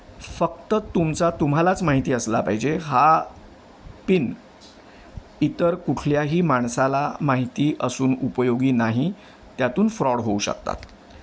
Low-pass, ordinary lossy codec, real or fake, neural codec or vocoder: none; none; real; none